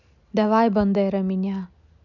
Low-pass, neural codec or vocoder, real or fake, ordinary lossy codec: 7.2 kHz; none; real; none